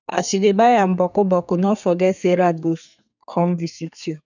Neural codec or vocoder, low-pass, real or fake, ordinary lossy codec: codec, 32 kHz, 1.9 kbps, SNAC; 7.2 kHz; fake; none